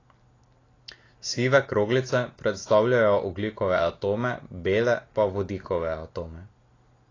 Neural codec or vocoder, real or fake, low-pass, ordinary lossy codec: none; real; 7.2 kHz; AAC, 32 kbps